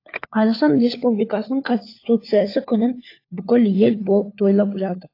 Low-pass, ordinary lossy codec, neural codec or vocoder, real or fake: 5.4 kHz; AAC, 32 kbps; codec, 16 kHz, 4 kbps, FunCodec, trained on LibriTTS, 50 frames a second; fake